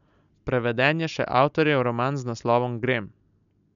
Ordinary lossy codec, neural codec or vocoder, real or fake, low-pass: none; none; real; 7.2 kHz